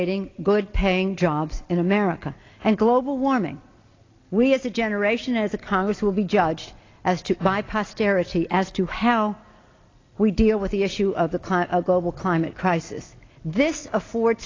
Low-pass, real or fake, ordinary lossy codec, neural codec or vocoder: 7.2 kHz; real; AAC, 32 kbps; none